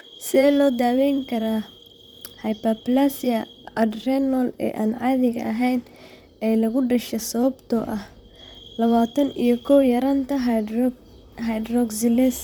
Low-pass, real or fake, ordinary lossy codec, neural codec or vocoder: none; fake; none; vocoder, 44.1 kHz, 128 mel bands, Pupu-Vocoder